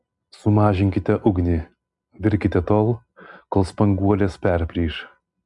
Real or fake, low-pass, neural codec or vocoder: real; 10.8 kHz; none